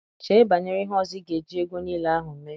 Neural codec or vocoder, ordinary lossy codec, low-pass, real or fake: none; none; none; real